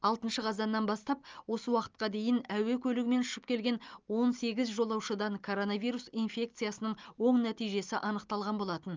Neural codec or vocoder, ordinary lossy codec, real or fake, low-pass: none; Opus, 24 kbps; real; 7.2 kHz